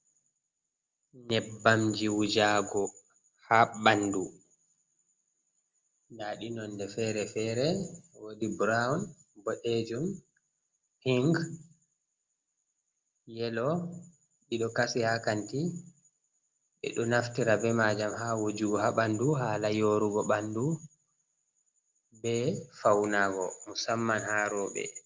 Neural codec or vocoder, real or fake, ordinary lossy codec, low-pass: none; real; Opus, 32 kbps; 7.2 kHz